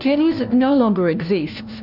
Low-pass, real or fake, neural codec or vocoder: 5.4 kHz; fake; codec, 16 kHz, 1 kbps, FunCodec, trained on LibriTTS, 50 frames a second